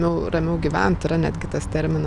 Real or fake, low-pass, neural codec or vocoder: real; 10.8 kHz; none